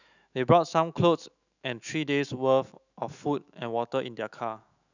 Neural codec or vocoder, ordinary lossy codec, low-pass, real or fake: autoencoder, 48 kHz, 128 numbers a frame, DAC-VAE, trained on Japanese speech; none; 7.2 kHz; fake